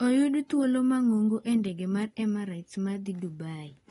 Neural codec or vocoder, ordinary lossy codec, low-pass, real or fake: none; AAC, 32 kbps; 10.8 kHz; real